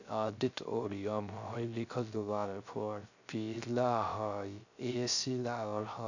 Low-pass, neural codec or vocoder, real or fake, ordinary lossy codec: 7.2 kHz; codec, 16 kHz, 0.3 kbps, FocalCodec; fake; none